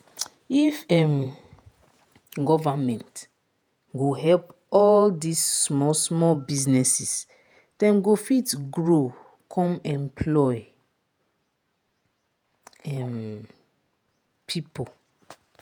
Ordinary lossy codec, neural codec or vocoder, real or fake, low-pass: none; vocoder, 48 kHz, 128 mel bands, Vocos; fake; none